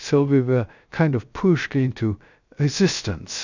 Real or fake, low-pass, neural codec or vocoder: fake; 7.2 kHz; codec, 16 kHz, 0.3 kbps, FocalCodec